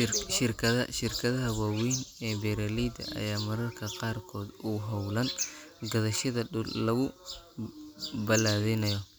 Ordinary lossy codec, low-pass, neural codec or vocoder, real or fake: none; none; none; real